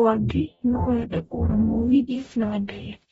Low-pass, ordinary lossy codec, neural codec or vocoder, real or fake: 19.8 kHz; AAC, 24 kbps; codec, 44.1 kHz, 0.9 kbps, DAC; fake